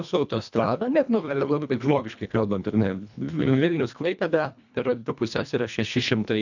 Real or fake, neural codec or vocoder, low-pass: fake; codec, 24 kHz, 1.5 kbps, HILCodec; 7.2 kHz